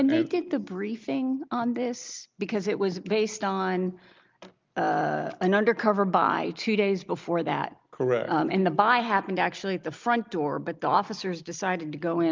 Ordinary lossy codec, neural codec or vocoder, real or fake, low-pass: Opus, 24 kbps; none; real; 7.2 kHz